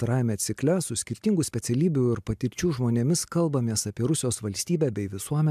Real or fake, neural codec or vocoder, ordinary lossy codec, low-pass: real; none; MP3, 96 kbps; 14.4 kHz